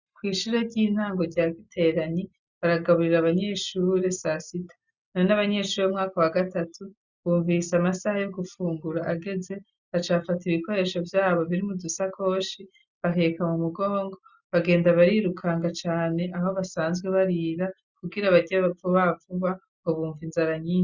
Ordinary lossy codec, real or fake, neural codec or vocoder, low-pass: Opus, 64 kbps; real; none; 7.2 kHz